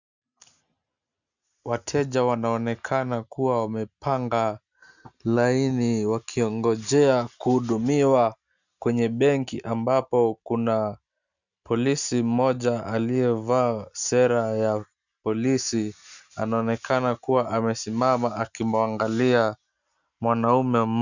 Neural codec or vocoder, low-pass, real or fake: none; 7.2 kHz; real